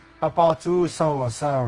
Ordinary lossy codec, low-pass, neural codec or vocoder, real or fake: Opus, 24 kbps; 10.8 kHz; codec, 24 kHz, 0.9 kbps, WavTokenizer, medium music audio release; fake